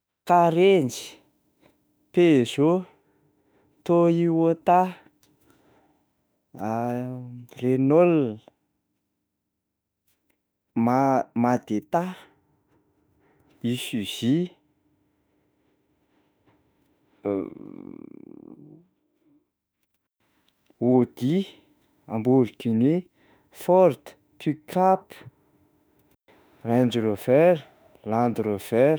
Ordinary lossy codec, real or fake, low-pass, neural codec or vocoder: none; fake; none; autoencoder, 48 kHz, 32 numbers a frame, DAC-VAE, trained on Japanese speech